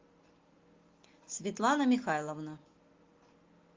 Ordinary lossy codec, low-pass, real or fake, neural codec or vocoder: Opus, 32 kbps; 7.2 kHz; real; none